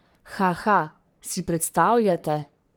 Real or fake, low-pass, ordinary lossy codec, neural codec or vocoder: fake; none; none; codec, 44.1 kHz, 3.4 kbps, Pupu-Codec